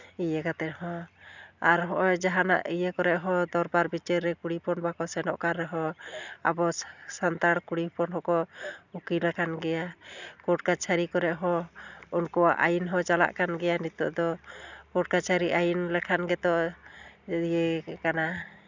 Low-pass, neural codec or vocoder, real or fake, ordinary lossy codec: 7.2 kHz; none; real; none